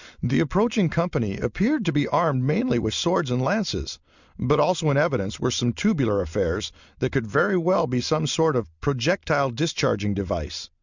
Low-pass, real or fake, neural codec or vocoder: 7.2 kHz; real; none